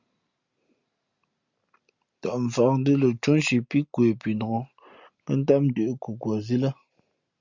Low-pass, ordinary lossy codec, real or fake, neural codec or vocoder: 7.2 kHz; Opus, 64 kbps; real; none